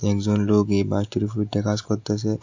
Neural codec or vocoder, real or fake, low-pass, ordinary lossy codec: none; real; 7.2 kHz; AAC, 48 kbps